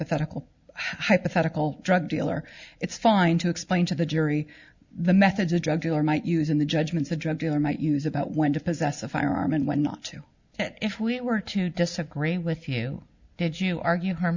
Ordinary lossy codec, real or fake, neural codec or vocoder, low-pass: Opus, 64 kbps; real; none; 7.2 kHz